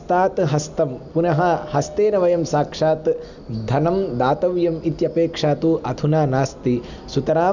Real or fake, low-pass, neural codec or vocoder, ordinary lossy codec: real; 7.2 kHz; none; none